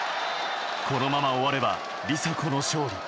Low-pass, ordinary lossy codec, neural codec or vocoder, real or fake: none; none; none; real